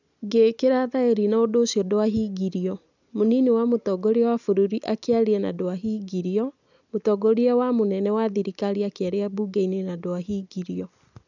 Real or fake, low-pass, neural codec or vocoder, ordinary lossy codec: real; 7.2 kHz; none; none